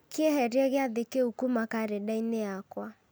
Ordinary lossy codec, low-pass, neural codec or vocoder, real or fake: none; none; none; real